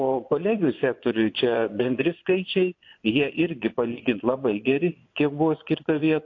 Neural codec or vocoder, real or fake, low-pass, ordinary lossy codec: vocoder, 24 kHz, 100 mel bands, Vocos; fake; 7.2 kHz; AAC, 48 kbps